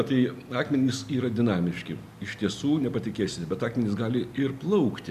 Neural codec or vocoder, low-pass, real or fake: none; 14.4 kHz; real